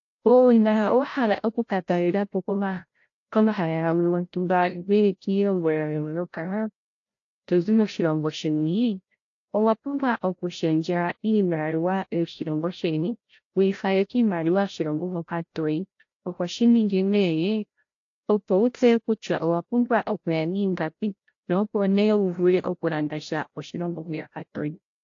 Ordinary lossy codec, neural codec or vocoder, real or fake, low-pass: AAC, 48 kbps; codec, 16 kHz, 0.5 kbps, FreqCodec, larger model; fake; 7.2 kHz